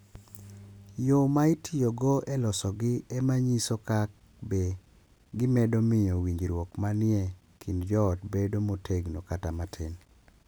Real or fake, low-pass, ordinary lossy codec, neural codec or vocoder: real; none; none; none